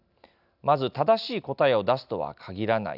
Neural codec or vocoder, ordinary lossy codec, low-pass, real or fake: none; none; 5.4 kHz; real